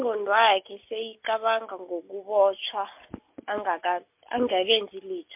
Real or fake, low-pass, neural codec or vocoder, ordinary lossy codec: real; 3.6 kHz; none; MP3, 32 kbps